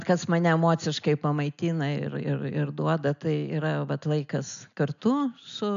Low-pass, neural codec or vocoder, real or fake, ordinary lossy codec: 7.2 kHz; none; real; MP3, 64 kbps